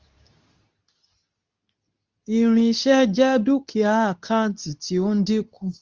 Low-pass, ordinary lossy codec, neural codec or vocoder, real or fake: 7.2 kHz; Opus, 32 kbps; codec, 24 kHz, 0.9 kbps, WavTokenizer, medium speech release version 2; fake